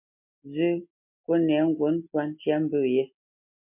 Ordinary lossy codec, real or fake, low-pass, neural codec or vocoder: MP3, 32 kbps; real; 3.6 kHz; none